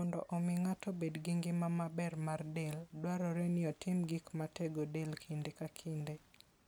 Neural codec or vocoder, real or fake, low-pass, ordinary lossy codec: none; real; none; none